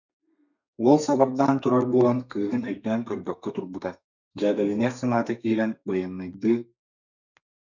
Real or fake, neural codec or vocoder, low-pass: fake; codec, 32 kHz, 1.9 kbps, SNAC; 7.2 kHz